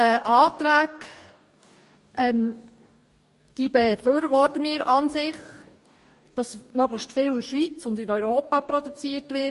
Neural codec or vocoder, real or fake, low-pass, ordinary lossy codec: codec, 44.1 kHz, 2.6 kbps, DAC; fake; 14.4 kHz; MP3, 48 kbps